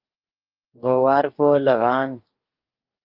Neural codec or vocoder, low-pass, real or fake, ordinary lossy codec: codec, 44.1 kHz, 2.6 kbps, DAC; 5.4 kHz; fake; Opus, 32 kbps